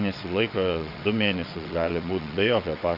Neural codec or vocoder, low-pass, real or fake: vocoder, 22.05 kHz, 80 mel bands, WaveNeXt; 5.4 kHz; fake